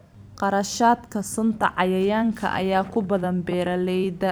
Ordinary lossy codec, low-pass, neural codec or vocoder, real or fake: none; none; vocoder, 44.1 kHz, 128 mel bands every 256 samples, BigVGAN v2; fake